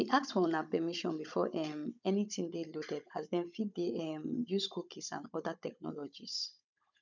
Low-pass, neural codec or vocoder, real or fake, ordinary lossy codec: 7.2 kHz; codec, 16 kHz, 16 kbps, FunCodec, trained on Chinese and English, 50 frames a second; fake; none